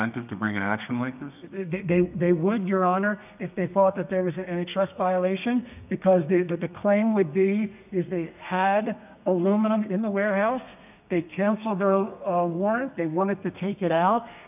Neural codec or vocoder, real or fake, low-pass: codec, 32 kHz, 1.9 kbps, SNAC; fake; 3.6 kHz